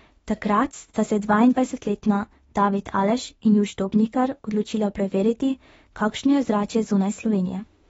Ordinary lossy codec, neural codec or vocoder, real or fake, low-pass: AAC, 24 kbps; autoencoder, 48 kHz, 32 numbers a frame, DAC-VAE, trained on Japanese speech; fake; 19.8 kHz